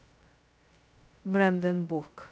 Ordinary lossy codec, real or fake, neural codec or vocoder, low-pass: none; fake; codec, 16 kHz, 0.2 kbps, FocalCodec; none